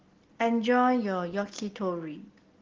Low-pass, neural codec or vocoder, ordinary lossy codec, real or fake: 7.2 kHz; none; Opus, 16 kbps; real